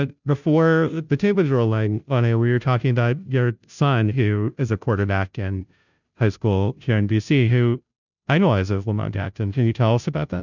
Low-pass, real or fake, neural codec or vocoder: 7.2 kHz; fake; codec, 16 kHz, 0.5 kbps, FunCodec, trained on Chinese and English, 25 frames a second